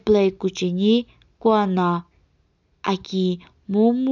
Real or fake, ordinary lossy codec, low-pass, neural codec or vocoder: real; none; 7.2 kHz; none